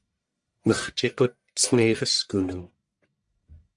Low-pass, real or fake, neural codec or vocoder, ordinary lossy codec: 10.8 kHz; fake; codec, 44.1 kHz, 1.7 kbps, Pupu-Codec; MP3, 96 kbps